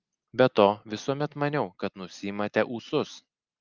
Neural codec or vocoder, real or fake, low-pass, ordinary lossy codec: none; real; 7.2 kHz; Opus, 24 kbps